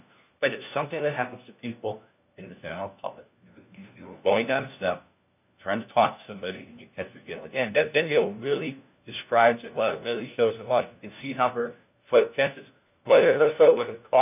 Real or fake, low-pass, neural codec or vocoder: fake; 3.6 kHz; codec, 16 kHz, 1 kbps, FunCodec, trained on LibriTTS, 50 frames a second